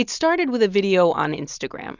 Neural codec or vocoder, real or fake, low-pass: none; real; 7.2 kHz